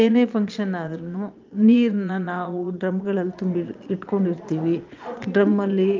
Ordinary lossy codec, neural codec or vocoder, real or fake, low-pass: Opus, 24 kbps; vocoder, 22.05 kHz, 80 mel bands, Vocos; fake; 7.2 kHz